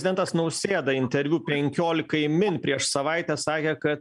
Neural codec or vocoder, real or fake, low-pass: none; real; 10.8 kHz